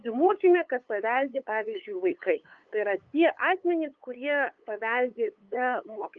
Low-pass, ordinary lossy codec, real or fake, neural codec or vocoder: 7.2 kHz; Opus, 24 kbps; fake; codec, 16 kHz, 8 kbps, FunCodec, trained on LibriTTS, 25 frames a second